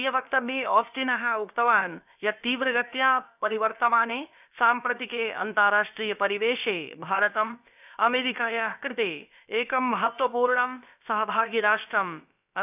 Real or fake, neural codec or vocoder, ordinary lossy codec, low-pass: fake; codec, 16 kHz, about 1 kbps, DyCAST, with the encoder's durations; none; 3.6 kHz